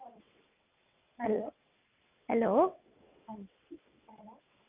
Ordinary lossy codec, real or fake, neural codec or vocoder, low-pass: none; fake; vocoder, 22.05 kHz, 80 mel bands, WaveNeXt; 3.6 kHz